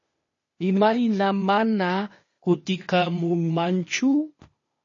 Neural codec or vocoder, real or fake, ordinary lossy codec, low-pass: codec, 16 kHz, 0.8 kbps, ZipCodec; fake; MP3, 32 kbps; 7.2 kHz